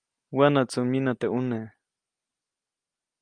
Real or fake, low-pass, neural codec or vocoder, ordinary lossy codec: real; 9.9 kHz; none; Opus, 32 kbps